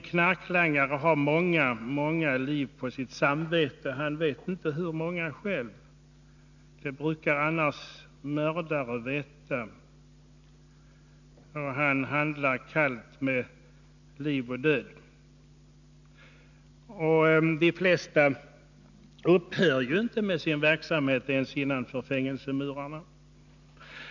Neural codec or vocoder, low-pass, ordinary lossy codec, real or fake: none; 7.2 kHz; none; real